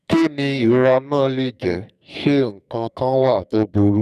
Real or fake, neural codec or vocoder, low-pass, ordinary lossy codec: fake; codec, 44.1 kHz, 2.6 kbps, SNAC; 14.4 kHz; none